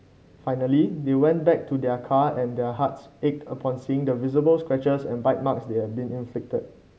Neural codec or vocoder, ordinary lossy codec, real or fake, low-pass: none; none; real; none